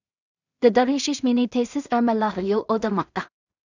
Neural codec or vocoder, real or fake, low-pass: codec, 16 kHz in and 24 kHz out, 0.4 kbps, LongCat-Audio-Codec, two codebook decoder; fake; 7.2 kHz